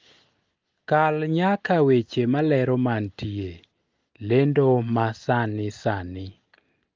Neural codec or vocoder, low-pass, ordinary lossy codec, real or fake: none; 7.2 kHz; Opus, 24 kbps; real